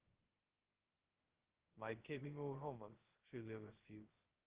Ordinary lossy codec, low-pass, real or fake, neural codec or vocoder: Opus, 16 kbps; 3.6 kHz; fake; codec, 16 kHz, 0.2 kbps, FocalCodec